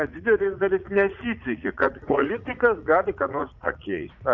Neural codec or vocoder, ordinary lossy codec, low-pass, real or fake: vocoder, 44.1 kHz, 80 mel bands, Vocos; MP3, 64 kbps; 7.2 kHz; fake